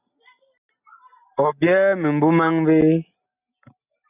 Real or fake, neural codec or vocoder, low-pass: real; none; 3.6 kHz